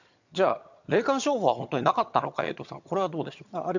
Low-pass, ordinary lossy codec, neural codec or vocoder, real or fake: 7.2 kHz; none; vocoder, 22.05 kHz, 80 mel bands, HiFi-GAN; fake